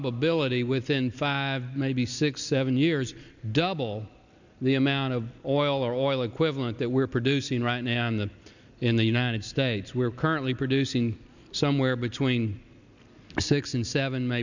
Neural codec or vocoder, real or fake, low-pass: none; real; 7.2 kHz